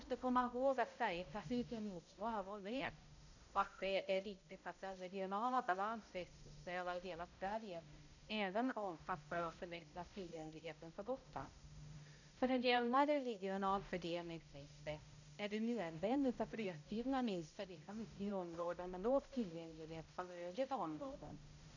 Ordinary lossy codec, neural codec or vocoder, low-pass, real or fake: none; codec, 16 kHz, 0.5 kbps, X-Codec, HuBERT features, trained on balanced general audio; 7.2 kHz; fake